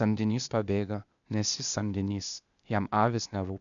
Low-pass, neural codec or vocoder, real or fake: 7.2 kHz; codec, 16 kHz, 0.8 kbps, ZipCodec; fake